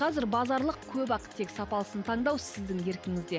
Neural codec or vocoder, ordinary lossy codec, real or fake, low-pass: none; none; real; none